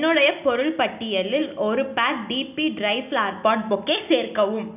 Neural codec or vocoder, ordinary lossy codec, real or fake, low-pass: none; none; real; 3.6 kHz